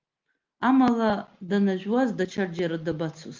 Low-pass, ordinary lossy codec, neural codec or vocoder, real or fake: 7.2 kHz; Opus, 32 kbps; none; real